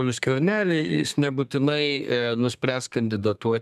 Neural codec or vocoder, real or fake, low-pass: codec, 32 kHz, 1.9 kbps, SNAC; fake; 14.4 kHz